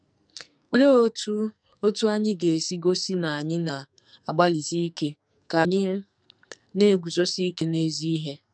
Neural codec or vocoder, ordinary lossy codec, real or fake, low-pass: codec, 44.1 kHz, 2.6 kbps, SNAC; none; fake; 9.9 kHz